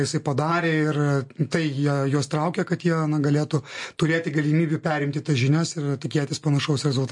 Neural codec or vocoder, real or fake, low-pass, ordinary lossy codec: none; real; 10.8 kHz; MP3, 48 kbps